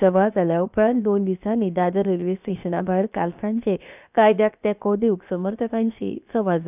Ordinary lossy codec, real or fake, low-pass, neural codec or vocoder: none; fake; 3.6 kHz; codec, 16 kHz, 0.7 kbps, FocalCodec